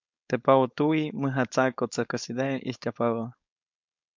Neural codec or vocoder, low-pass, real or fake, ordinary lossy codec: codec, 16 kHz, 4.8 kbps, FACodec; 7.2 kHz; fake; MP3, 64 kbps